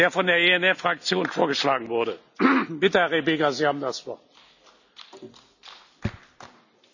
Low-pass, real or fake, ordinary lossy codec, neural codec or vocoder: 7.2 kHz; real; none; none